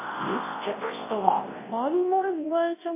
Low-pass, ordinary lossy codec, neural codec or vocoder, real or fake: 3.6 kHz; MP3, 16 kbps; codec, 24 kHz, 0.9 kbps, WavTokenizer, large speech release; fake